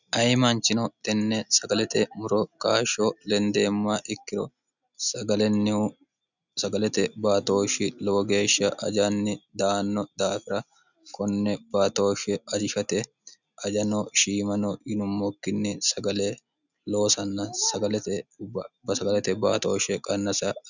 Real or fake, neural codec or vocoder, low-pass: real; none; 7.2 kHz